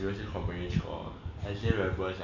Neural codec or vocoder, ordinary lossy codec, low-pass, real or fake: none; none; 7.2 kHz; real